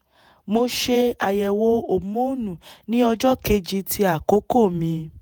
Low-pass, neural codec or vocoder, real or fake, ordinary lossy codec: none; vocoder, 48 kHz, 128 mel bands, Vocos; fake; none